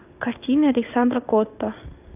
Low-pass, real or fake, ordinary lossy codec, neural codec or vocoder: 3.6 kHz; fake; AAC, 32 kbps; codec, 16 kHz in and 24 kHz out, 1 kbps, XY-Tokenizer